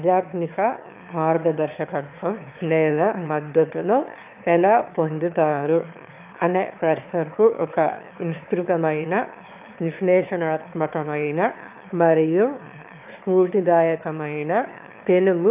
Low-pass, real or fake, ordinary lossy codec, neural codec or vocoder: 3.6 kHz; fake; AAC, 32 kbps; autoencoder, 22.05 kHz, a latent of 192 numbers a frame, VITS, trained on one speaker